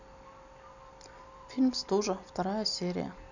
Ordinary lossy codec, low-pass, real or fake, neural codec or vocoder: none; 7.2 kHz; real; none